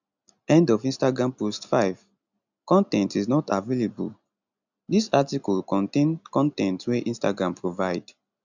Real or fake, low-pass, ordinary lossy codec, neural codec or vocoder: real; 7.2 kHz; none; none